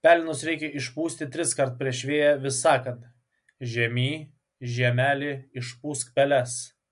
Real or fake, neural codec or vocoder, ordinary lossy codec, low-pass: real; none; MP3, 64 kbps; 10.8 kHz